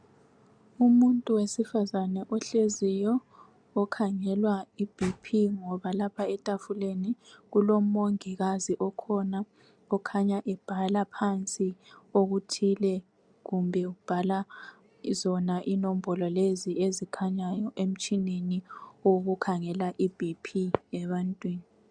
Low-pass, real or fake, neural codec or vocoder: 9.9 kHz; real; none